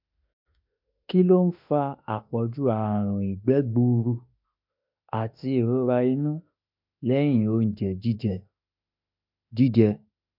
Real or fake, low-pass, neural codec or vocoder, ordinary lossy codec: fake; 5.4 kHz; autoencoder, 48 kHz, 32 numbers a frame, DAC-VAE, trained on Japanese speech; MP3, 48 kbps